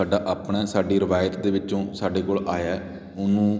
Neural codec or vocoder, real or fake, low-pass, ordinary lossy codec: none; real; none; none